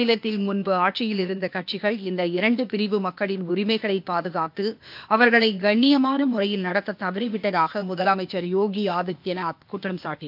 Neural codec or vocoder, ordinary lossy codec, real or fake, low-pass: codec, 16 kHz, 0.8 kbps, ZipCodec; MP3, 48 kbps; fake; 5.4 kHz